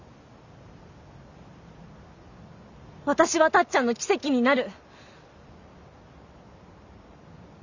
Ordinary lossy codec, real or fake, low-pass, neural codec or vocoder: none; real; 7.2 kHz; none